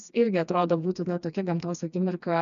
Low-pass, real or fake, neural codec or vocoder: 7.2 kHz; fake; codec, 16 kHz, 2 kbps, FreqCodec, smaller model